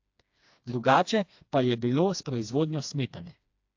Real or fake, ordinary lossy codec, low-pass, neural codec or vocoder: fake; AAC, 48 kbps; 7.2 kHz; codec, 16 kHz, 2 kbps, FreqCodec, smaller model